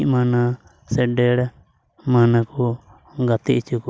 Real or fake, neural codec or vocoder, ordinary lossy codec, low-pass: real; none; none; none